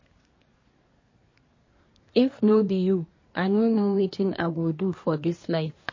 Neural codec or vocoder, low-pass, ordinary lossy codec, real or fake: codec, 24 kHz, 1 kbps, SNAC; 7.2 kHz; MP3, 32 kbps; fake